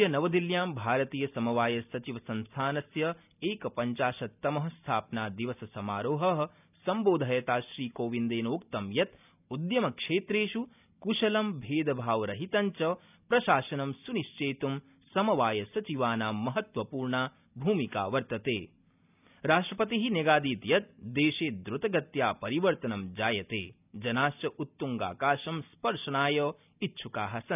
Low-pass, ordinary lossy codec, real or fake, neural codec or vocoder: 3.6 kHz; none; real; none